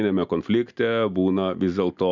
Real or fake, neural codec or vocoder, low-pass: real; none; 7.2 kHz